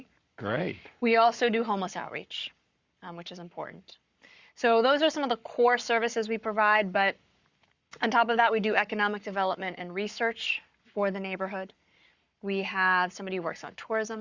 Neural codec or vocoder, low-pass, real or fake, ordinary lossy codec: codec, 44.1 kHz, 7.8 kbps, Pupu-Codec; 7.2 kHz; fake; Opus, 64 kbps